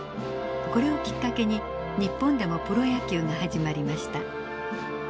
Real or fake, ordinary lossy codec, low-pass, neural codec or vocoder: real; none; none; none